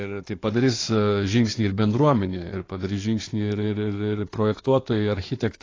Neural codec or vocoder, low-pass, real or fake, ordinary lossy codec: codec, 16 kHz in and 24 kHz out, 2.2 kbps, FireRedTTS-2 codec; 7.2 kHz; fake; AAC, 32 kbps